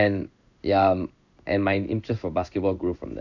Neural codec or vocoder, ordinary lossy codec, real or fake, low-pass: codec, 16 kHz in and 24 kHz out, 1 kbps, XY-Tokenizer; none; fake; 7.2 kHz